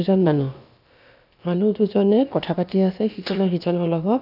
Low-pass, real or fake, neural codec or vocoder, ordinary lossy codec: 5.4 kHz; fake; codec, 16 kHz, about 1 kbps, DyCAST, with the encoder's durations; none